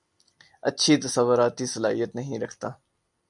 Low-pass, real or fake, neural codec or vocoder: 10.8 kHz; real; none